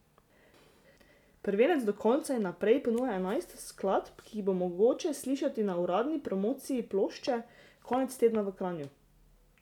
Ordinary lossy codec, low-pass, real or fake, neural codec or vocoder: none; 19.8 kHz; real; none